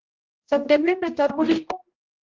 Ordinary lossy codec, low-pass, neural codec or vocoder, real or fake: Opus, 16 kbps; 7.2 kHz; codec, 16 kHz, 0.5 kbps, X-Codec, HuBERT features, trained on general audio; fake